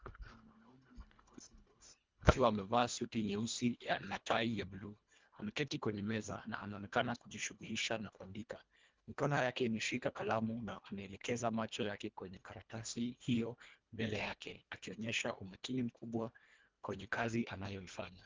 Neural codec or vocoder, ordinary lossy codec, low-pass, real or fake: codec, 24 kHz, 1.5 kbps, HILCodec; Opus, 32 kbps; 7.2 kHz; fake